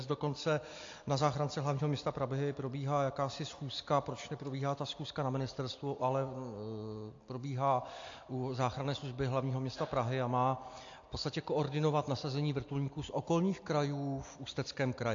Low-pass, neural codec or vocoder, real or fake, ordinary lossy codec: 7.2 kHz; none; real; AAC, 48 kbps